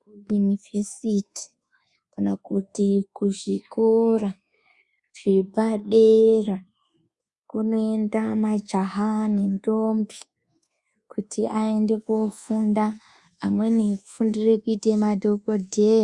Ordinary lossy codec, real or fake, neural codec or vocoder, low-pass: Opus, 64 kbps; fake; codec, 24 kHz, 1.2 kbps, DualCodec; 10.8 kHz